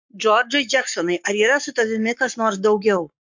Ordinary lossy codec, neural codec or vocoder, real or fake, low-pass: MP3, 64 kbps; codec, 44.1 kHz, 7.8 kbps, Pupu-Codec; fake; 7.2 kHz